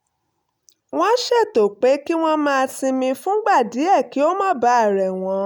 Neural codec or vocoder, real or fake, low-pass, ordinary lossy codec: none; real; none; none